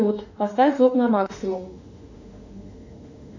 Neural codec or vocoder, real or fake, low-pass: autoencoder, 48 kHz, 32 numbers a frame, DAC-VAE, trained on Japanese speech; fake; 7.2 kHz